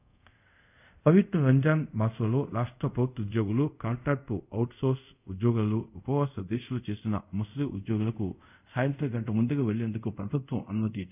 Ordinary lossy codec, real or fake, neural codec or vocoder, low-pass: none; fake; codec, 24 kHz, 0.5 kbps, DualCodec; 3.6 kHz